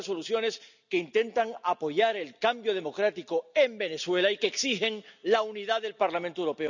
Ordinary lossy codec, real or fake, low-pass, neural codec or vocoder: none; real; 7.2 kHz; none